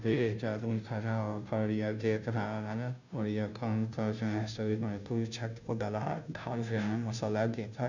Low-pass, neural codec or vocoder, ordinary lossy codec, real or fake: 7.2 kHz; codec, 16 kHz, 0.5 kbps, FunCodec, trained on Chinese and English, 25 frames a second; none; fake